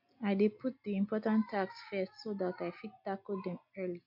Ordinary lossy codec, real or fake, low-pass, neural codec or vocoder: MP3, 48 kbps; real; 5.4 kHz; none